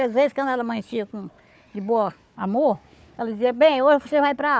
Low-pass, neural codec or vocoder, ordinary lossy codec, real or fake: none; codec, 16 kHz, 4 kbps, FunCodec, trained on Chinese and English, 50 frames a second; none; fake